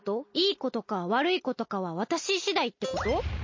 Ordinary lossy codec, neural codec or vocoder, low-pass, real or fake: MP3, 32 kbps; none; 7.2 kHz; real